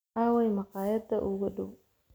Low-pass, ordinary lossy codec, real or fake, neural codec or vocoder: none; none; real; none